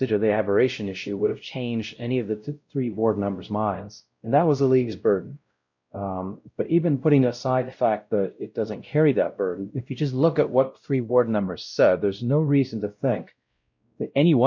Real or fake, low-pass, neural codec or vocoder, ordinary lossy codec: fake; 7.2 kHz; codec, 16 kHz, 0.5 kbps, X-Codec, WavLM features, trained on Multilingual LibriSpeech; MP3, 48 kbps